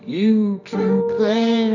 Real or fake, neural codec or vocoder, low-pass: fake; codec, 24 kHz, 0.9 kbps, WavTokenizer, medium music audio release; 7.2 kHz